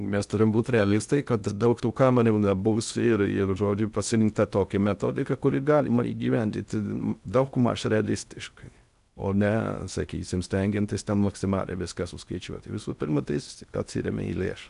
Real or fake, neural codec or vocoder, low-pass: fake; codec, 16 kHz in and 24 kHz out, 0.6 kbps, FocalCodec, streaming, 2048 codes; 10.8 kHz